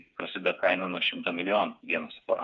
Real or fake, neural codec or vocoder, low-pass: fake; codec, 16 kHz, 4 kbps, FreqCodec, smaller model; 7.2 kHz